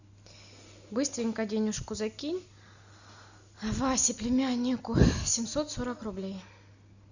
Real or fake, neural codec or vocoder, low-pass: real; none; 7.2 kHz